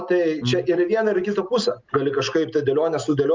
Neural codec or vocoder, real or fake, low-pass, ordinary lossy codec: none; real; 7.2 kHz; Opus, 24 kbps